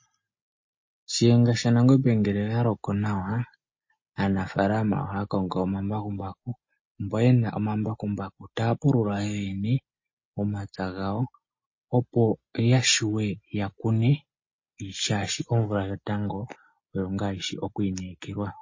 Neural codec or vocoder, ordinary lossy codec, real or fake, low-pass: none; MP3, 32 kbps; real; 7.2 kHz